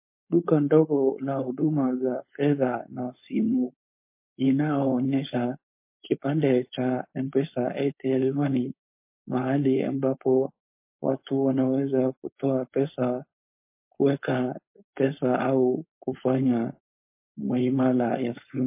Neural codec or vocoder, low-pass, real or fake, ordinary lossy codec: codec, 16 kHz, 4.8 kbps, FACodec; 3.6 kHz; fake; MP3, 24 kbps